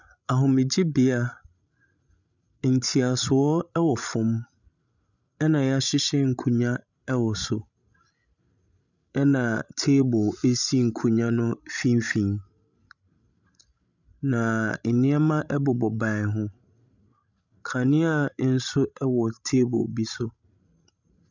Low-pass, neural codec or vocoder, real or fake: 7.2 kHz; codec, 16 kHz, 16 kbps, FreqCodec, larger model; fake